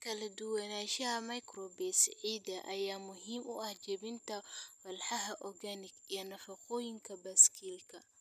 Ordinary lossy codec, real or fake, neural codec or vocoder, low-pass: none; real; none; 14.4 kHz